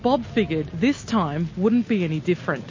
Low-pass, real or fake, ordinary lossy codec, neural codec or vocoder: 7.2 kHz; real; MP3, 32 kbps; none